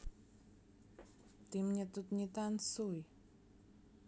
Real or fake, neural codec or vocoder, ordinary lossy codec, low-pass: real; none; none; none